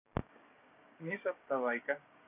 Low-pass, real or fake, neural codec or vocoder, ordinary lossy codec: 3.6 kHz; fake; codec, 16 kHz, 6 kbps, DAC; none